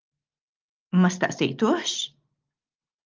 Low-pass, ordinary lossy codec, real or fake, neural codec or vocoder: 7.2 kHz; Opus, 32 kbps; real; none